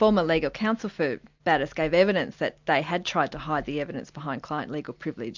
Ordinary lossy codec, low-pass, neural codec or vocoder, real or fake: MP3, 64 kbps; 7.2 kHz; none; real